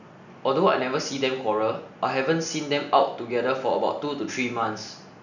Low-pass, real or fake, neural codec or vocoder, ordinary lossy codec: 7.2 kHz; real; none; none